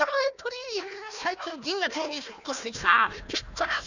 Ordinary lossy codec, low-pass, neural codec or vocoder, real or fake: none; 7.2 kHz; codec, 16 kHz, 1 kbps, FunCodec, trained on Chinese and English, 50 frames a second; fake